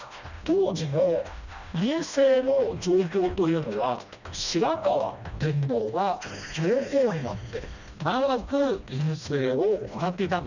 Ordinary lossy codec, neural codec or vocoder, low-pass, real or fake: none; codec, 16 kHz, 1 kbps, FreqCodec, smaller model; 7.2 kHz; fake